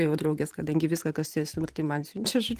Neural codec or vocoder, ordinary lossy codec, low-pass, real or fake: vocoder, 44.1 kHz, 128 mel bands every 512 samples, BigVGAN v2; Opus, 24 kbps; 14.4 kHz; fake